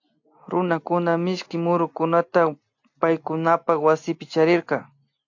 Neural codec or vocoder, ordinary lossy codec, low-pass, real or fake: none; AAC, 48 kbps; 7.2 kHz; real